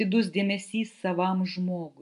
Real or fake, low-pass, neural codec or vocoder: real; 10.8 kHz; none